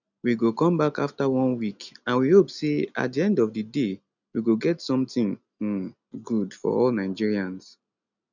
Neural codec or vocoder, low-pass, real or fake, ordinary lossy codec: none; 7.2 kHz; real; none